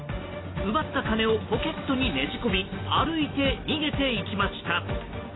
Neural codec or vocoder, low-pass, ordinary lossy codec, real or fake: none; 7.2 kHz; AAC, 16 kbps; real